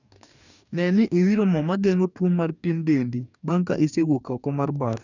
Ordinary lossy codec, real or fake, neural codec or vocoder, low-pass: none; fake; codec, 44.1 kHz, 2.6 kbps, DAC; 7.2 kHz